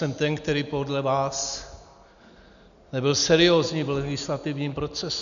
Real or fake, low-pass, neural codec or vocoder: real; 7.2 kHz; none